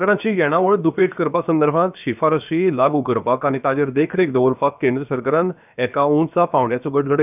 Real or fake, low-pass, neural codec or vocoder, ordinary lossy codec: fake; 3.6 kHz; codec, 16 kHz, about 1 kbps, DyCAST, with the encoder's durations; none